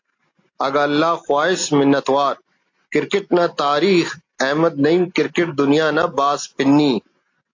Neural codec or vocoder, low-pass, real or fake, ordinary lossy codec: none; 7.2 kHz; real; AAC, 48 kbps